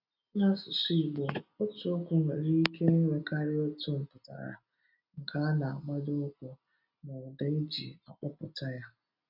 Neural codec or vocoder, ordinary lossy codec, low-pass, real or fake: none; none; 5.4 kHz; real